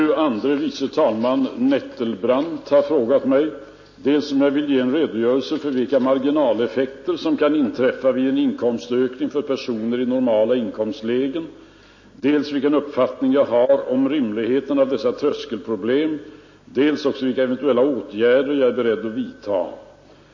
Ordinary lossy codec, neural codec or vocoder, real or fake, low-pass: MP3, 32 kbps; none; real; 7.2 kHz